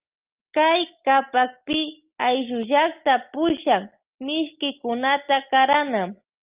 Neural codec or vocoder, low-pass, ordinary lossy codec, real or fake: none; 3.6 kHz; Opus, 32 kbps; real